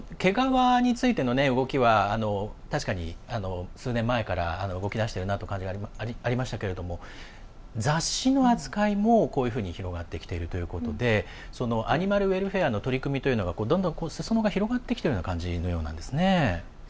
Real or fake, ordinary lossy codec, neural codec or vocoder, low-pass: real; none; none; none